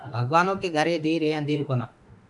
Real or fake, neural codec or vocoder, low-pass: fake; autoencoder, 48 kHz, 32 numbers a frame, DAC-VAE, trained on Japanese speech; 10.8 kHz